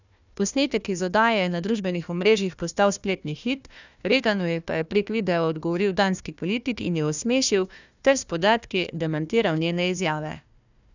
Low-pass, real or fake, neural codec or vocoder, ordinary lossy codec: 7.2 kHz; fake; codec, 16 kHz, 1 kbps, FunCodec, trained on Chinese and English, 50 frames a second; none